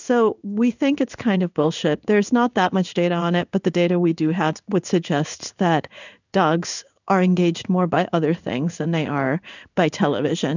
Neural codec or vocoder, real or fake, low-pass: codec, 16 kHz in and 24 kHz out, 1 kbps, XY-Tokenizer; fake; 7.2 kHz